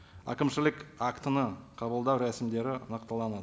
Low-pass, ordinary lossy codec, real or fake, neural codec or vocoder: none; none; real; none